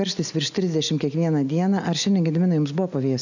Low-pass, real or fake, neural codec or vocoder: 7.2 kHz; real; none